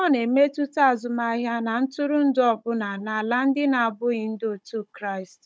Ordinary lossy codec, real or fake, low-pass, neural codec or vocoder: none; fake; none; codec, 16 kHz, 16 kbps, FunCodec, trained on Chinese and English, 50 frames a second